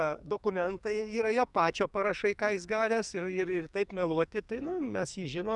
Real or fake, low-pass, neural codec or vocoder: fake; 10.8 kHz; codec, 44.1 kHz, 2.6 kbps, SNAC